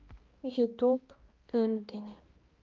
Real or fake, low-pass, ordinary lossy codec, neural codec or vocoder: fake; 7.2 kHz; Opus, 32 kbps; codec, 16 kHz, 1 kbps, X-Codec, HuBERT features, trained on balanced general audio